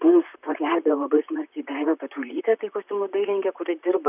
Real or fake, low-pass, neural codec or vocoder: fake; 3.6 kHz; vocoder, 44.1 kHz, 128 mel bands, Pupu-Vocoder